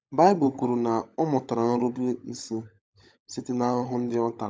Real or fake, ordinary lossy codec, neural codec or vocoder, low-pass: fake; none; codec, 16 kHz, 16 kbps, FunCodec, trained on LibriTTS, 50 frames a second; none